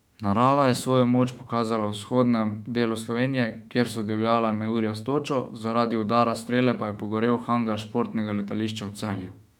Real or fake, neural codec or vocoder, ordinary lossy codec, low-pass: fake; autoencoder, 48 kHz, 32 numbers a frame, DAC-VAE, trained on Japanese speech; none; 19.8 kHz